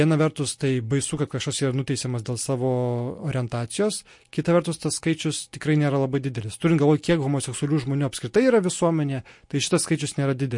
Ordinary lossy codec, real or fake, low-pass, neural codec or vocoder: MP3, 48 kbps; real; 10.8 kHz; none